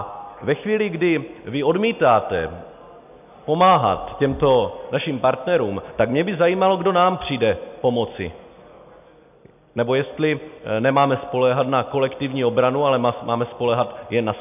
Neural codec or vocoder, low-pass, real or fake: none; 3.6 kHz; real